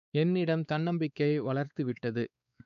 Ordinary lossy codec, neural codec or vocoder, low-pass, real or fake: none; codec, 16 kHz, 4 kbps, X-Codec, WavLM features, trained on Multilingual LibriSpeech; 7.2 kHz; fake